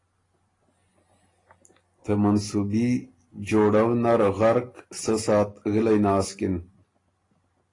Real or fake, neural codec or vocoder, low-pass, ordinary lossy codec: real; none; 10.8 kHz; AAC, 32 kbps